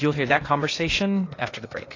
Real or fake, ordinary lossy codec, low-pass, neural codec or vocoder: fake; AAC, 32 kbps; 7.2 kHz; codec, 16 kHz, 0.8 kbps, ZipCodec